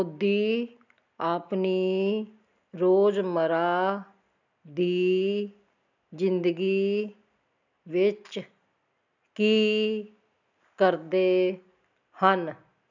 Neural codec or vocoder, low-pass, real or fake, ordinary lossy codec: none; 7.2 kHz; real; none